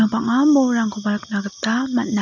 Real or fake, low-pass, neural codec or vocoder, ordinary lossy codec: real; 7.2 kHz; none; none